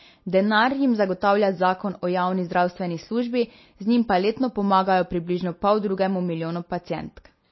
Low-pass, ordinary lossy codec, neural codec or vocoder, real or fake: 7.2 kHz; MP3, 24 kbps; none; real